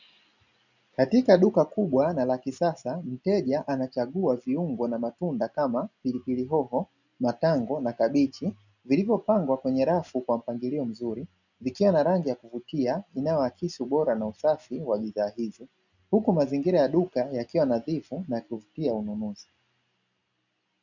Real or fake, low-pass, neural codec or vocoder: real; 7.2 kHz; none